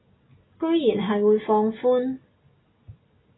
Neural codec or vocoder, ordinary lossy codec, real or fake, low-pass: none; AAC, 16 kbps; real; 7.2 kHz